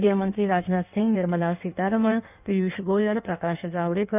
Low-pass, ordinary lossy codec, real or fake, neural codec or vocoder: 3.6 kHz; none; fake; codec, 16 kHz in and 24 kHz out, 1.1 kbps, FireRedTTS-2 codec